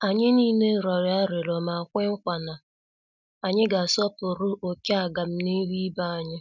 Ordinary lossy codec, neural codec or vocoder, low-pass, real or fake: none; none; 7.2 kHz; real